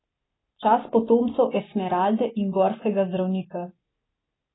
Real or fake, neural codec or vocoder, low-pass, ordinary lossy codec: real; none; 7.2 kHz; AAC, 16 kbps